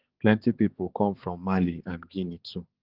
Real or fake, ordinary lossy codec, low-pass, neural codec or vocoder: fake; Opus, 16 kbps; 5.4 kHz; codec, 16 kHz, 4 kbps, X-Codec, HuBERT features, trained on balanced general audio